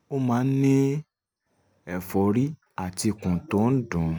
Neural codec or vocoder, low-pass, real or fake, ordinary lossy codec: none; none; real; none